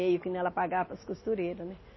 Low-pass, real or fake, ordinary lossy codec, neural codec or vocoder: 7.2 kHz; real; MP3, 24 kbps; none